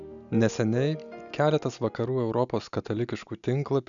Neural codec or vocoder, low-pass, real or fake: none; 7.2 kHz; real